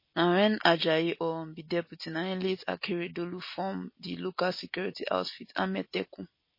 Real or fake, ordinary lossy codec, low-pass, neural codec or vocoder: real; MP3, 24 kbps; 5.4 kHz; none